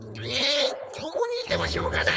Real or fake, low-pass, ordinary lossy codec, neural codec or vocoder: fake; none; none; codec, 16 kHz, 4.8 kbps, FACodec